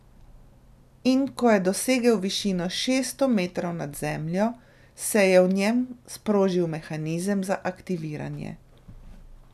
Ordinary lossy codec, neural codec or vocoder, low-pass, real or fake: none; none; 14.4 kHz; real